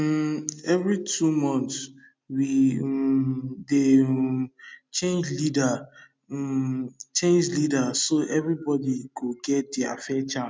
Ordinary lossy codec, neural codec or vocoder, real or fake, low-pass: none; none; real; none